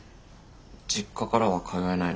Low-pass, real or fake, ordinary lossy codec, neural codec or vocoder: none; real; none; none